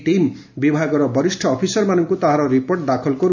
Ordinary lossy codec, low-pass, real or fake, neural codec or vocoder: none; 7.2 kHz; real; none